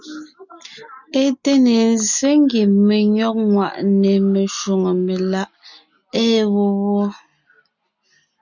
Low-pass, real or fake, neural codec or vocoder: 7.2 kHz; real; none